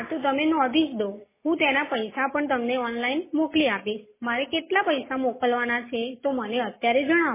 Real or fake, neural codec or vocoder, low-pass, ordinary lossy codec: real; none; 3.6 kHz; MP3, 16 kbps